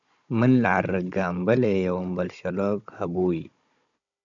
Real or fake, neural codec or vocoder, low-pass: fake; codec, 16 kHz, 16 kbps, FunCodec, trained on Chinese and English, 50 frames a second; 7.2 kHz